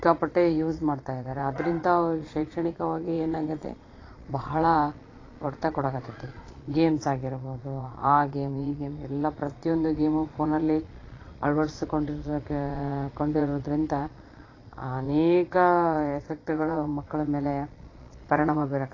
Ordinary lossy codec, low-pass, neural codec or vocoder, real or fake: AAC, 32 kbps; 7.2 kHz; vocoder, 22.05 kHz, 80 mel bands, Vocos; fake